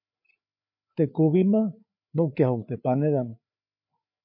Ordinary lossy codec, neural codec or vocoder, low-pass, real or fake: MP3, 32 kbps; codec, 16 kHz, 4 kbps, FreqCodec, larger model; 5.4 kHz; fake